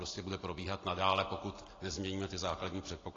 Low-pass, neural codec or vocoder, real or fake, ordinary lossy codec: 7.2 kHz; none; real; AAC, 32 kbps